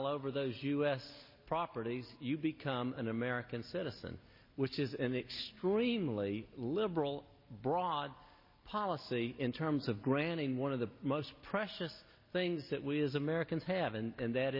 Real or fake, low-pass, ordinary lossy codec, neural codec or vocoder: real; 5.4 kHz; MP3, 24 kbps; none